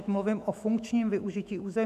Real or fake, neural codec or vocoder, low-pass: fake; autoencoder, 48 kHz, 128 numbers a frame, DAC-VAE, trained on Japanese speech; 14.4 kHz